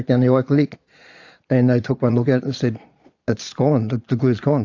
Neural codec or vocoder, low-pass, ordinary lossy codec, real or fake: vocoder, 22.05 kHz, 80 mel bands, Vocos; 7.2 kHz; AAC, 48 kbps; fake